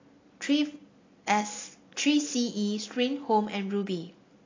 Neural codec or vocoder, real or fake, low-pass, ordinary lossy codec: none; real; 7.2 kHz; AAC, 32 kbps